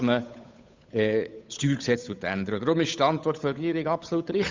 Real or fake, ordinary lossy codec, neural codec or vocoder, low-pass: fake; MP3, 64 kbps; codec, 16 kHz, 8 kbps, FunCodec, trained on Chinese and English, 25 frames a second; 7.2 kHz